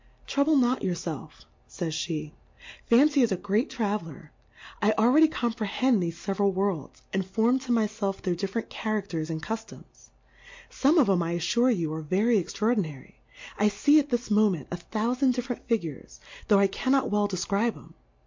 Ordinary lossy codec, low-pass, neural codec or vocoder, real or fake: MP3, 48 kbps; 7.2 kHz; none; real